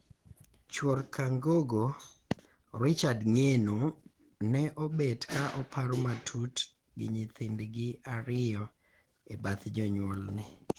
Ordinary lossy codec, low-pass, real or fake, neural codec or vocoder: Opus, 16 kbps; 19.8 kHz; fake; autoencoder, 48 kHz, 128 numbers a frame, DAC-VAE, trained on Japanese speech